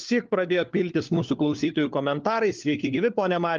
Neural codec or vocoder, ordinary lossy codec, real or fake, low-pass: codec, 16 kHz, 4 kbps, FunCodec, trained on LibriTTS, 50 frames a second; Opus, 32 kbps; fake; 7.2 kHz